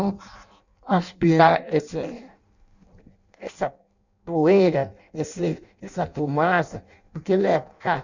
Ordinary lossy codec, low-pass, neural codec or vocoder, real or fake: none; 7.2 kHz; codec, 16 kHz in and 24 kHz out, 0.6 kbps, FireRedTTS-2 codec; fake